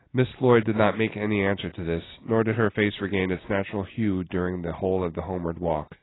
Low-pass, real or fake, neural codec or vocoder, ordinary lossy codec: 7.2 kHz; real; none; AAC, 16 kbps